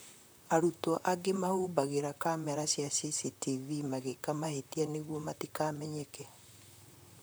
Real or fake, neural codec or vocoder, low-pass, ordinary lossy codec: fake; vocoder, 44.1 kHz, 128 mel bands, Pupu-Vocoder; none; none